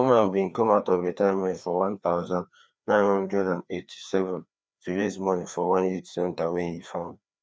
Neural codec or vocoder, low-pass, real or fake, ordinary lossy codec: codec, 16 kHz, 2 kbps, FreqCodec, larger model; none; fake; none